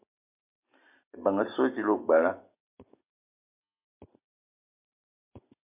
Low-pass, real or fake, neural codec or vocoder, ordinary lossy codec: 3.6 kHz; real; none; MP3, 32 kbps